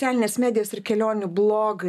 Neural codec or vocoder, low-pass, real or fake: none; 14.4 kHz; real